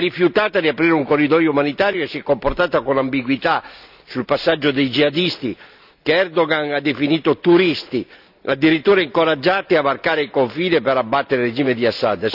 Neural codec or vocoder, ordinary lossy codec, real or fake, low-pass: none; none; real; 5.4 kHz